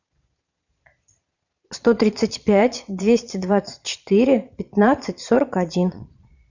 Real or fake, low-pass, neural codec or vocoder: fake; 7.2 kHz; vocoder, 22.05 kHz, 80 mel bands, Vocos